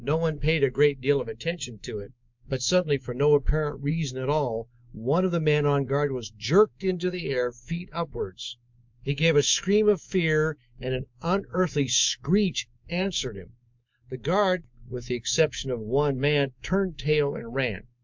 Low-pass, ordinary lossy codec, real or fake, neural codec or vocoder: 7.2 kHz; MP3, 64 kbps; fake; codec, 24 kHz, 3.1 kbps, DualCodec